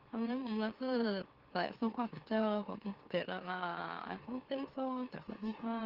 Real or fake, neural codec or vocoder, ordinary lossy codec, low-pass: fake; autoencoder, 44.1 kHz, a latent of 192 numbers a frame, MeloTTS; Opus, 16 kbps; 5.4 kHz